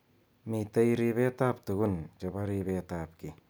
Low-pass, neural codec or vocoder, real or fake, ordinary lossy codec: none; none; real; none